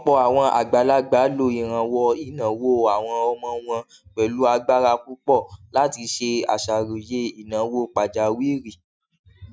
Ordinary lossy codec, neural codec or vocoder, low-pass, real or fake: none; none; none; real